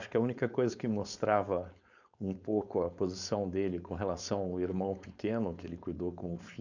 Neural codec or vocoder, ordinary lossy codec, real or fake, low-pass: codec, 16 kHz, 4.8 kbps, FACodec; none; fake; 7.2 kHz